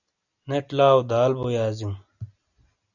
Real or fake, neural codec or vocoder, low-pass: real; none; 7.2 kHz